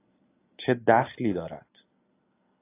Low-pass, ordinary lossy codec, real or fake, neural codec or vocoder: 3.6 kHz; AAC, 24 kbps; real; none